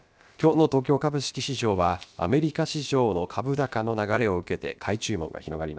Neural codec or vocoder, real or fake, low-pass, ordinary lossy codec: codec, 16 kHz, about 1 kbps, DyCAST, with the encoder's durations; fake; none; none